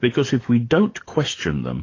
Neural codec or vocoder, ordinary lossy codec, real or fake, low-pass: codec, 44.1 kHz, 7.8 kbps, DAC; AAC, 32 kbps; fake; 7.2 kHz